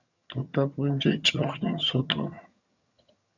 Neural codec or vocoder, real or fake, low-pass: vocoder, 22.05 kHz, 80 mel bands, HiFi-GAN; fake; 7.2 kHz